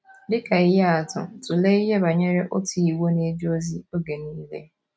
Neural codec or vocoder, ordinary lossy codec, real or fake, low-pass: none; none; real; none